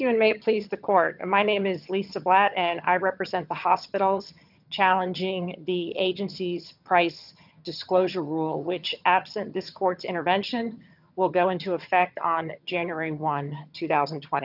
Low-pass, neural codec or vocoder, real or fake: 5.4 kHz; vocoder, 22.05 kHz, 80 mel bands, HiFi-GAN; fake